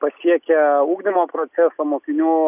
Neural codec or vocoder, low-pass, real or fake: none; 3.6 kHz; real